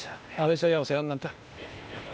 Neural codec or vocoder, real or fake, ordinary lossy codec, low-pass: codec, 16 kHz, 0.8 kbps, ZipCodec; fake; none; none